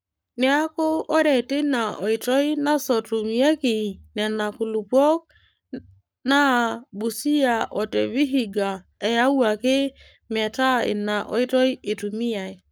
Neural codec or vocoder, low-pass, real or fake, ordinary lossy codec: codec, 44.1 kHz, 7.8 kbps, Pupu-Codec; none; fake; none